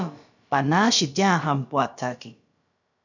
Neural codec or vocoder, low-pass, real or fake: codec, 16 kHz, about 1 kbps, DyCAST, with the encoder's durations; 7.2 kHz; fake